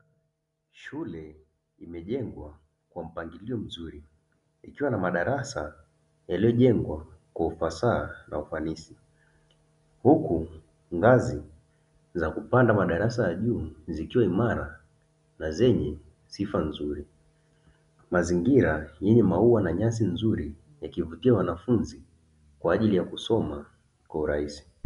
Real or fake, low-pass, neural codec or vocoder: real; 10.8 kHz; none